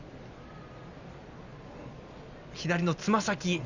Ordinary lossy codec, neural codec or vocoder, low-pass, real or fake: Opus, 64 kbps; none; 7.2 kHz; real